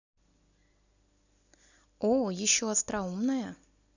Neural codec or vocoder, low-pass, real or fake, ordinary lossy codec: none; 7.2 kHz; real; none